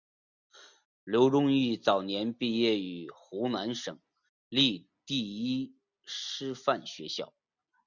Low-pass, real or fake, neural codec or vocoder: 7.2 kHz; real; none